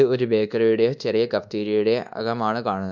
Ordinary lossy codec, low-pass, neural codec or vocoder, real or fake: none; 7.2 kHz; codec, 24 kHz, 1.2 kbps, DualCodec; fake